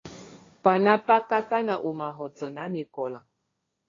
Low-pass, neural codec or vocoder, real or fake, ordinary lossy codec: 7.2 kHz; codec, 16 kHz, 1.1 kbps, Voila-Tokenizer; fake; AAC, 32 kbps